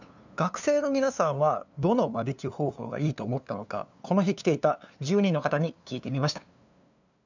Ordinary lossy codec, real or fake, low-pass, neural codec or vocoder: none; fake; 7.2 kHz; codec, 16 kHz, 2 kbps, FunCodec, trained on LibriTTS, 25 frames a second